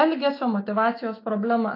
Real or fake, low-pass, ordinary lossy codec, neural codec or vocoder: fake; 5.4 kHz; AAC, 32 kbps; autoencoder, 48 kHz, 128 numbers a frame, DAC-VAE, trained on Japanese speech